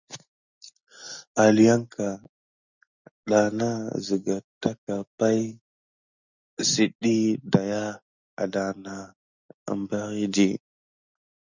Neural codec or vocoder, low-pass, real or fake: none; 7.2 kHz; real